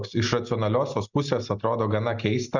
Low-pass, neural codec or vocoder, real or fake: 7.2 kHz; none; real